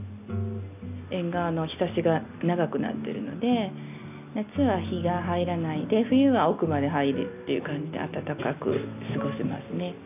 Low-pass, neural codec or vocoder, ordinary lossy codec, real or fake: 3.6 kHz; none; none; real